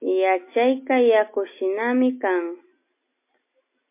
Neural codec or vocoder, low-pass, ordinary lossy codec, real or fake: none; 3.6 kHz; MP3, 24 kbps; real